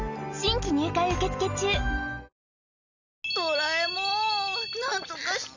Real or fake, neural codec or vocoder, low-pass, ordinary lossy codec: real; none; 7.2 kHz; none